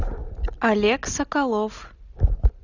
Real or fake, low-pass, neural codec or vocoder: real; 7.2 kHz; none